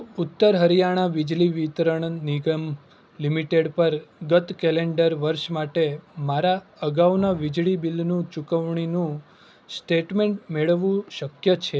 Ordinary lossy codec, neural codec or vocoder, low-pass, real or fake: none; none; none; real